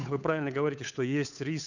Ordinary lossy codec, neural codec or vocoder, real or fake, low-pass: none; codec, 16 kHz, 8 kbps, FunCodec, trained on Chinese and English, 25 frames a second; fake; 7.2 kHz